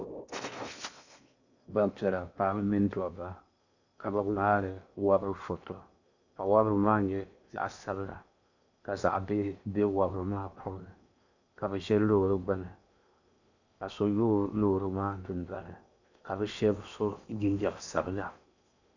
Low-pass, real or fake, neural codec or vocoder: 7.2 kHz; fake; codec, 16 kHz in and 24 kHz out, 0.8 kbps, FocalCodec, streaming, 65536 codes